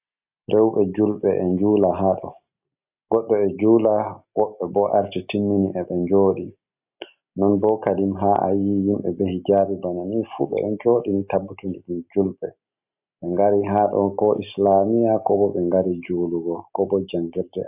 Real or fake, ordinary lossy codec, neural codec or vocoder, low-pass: real; AAC, 32 kbps; none; 3.6 kHz